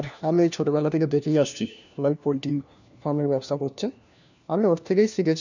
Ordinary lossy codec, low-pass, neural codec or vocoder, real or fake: none; 7.2 kHz; codec, 16 kHz, 1 kbps, FunCodec, trained on LibriTTS, 50 frames a second; fake